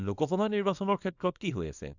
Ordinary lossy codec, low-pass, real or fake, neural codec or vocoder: none; 7.2 kHz; fake; codec, 24 kHz, 0.9 kbps, WavTokenizer, small release